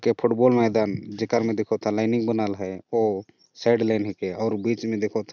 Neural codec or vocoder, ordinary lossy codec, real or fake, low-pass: none; none; real; 7.2 kHz